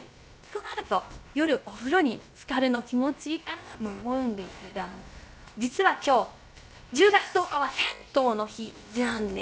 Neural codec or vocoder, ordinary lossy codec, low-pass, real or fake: codec, 16 kHz, about 1 kbps, DyCAST, with the encoder's durations; none; none; fake